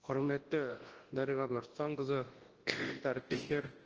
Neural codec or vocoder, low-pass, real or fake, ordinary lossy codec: codec, 24 kHz, 0.9 kbps, WavTokenizer, large speech release; 7.2 kHz; fake; Opus, 16 kbps